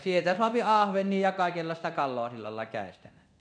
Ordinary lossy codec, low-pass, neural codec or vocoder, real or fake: none; 9.9 kHz; codec, 24 kHz, 0.9 kbps, DualCodec; fake